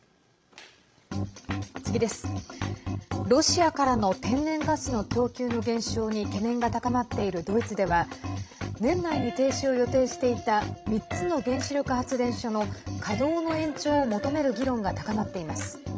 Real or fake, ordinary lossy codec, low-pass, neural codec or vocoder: fake; none; none; codec, 16 kHz, 16 kbps, FreqCodec, larger model